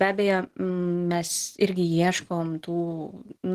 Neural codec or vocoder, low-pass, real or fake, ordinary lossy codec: none; 14.4 kHz; real; Opus, 16 kbps